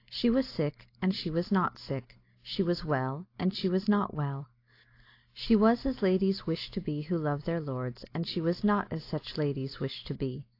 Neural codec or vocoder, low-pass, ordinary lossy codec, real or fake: none; 5.4 kHz; AAC, 32 kbps; real